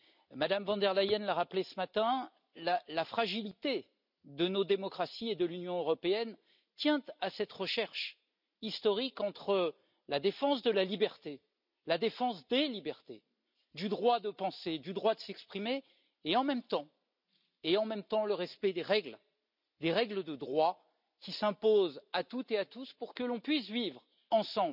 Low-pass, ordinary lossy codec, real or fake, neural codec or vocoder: 5.4 kHz; none; real; none